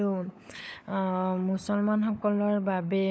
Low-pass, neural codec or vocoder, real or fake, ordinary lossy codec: none; codec, 16 kHz, 16 kbps, FunCodec, trained on LibriTTS, 50 frames a second; fake; none